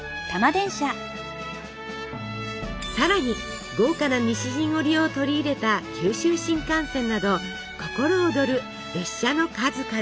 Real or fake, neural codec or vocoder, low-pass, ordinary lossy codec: real; none; none; none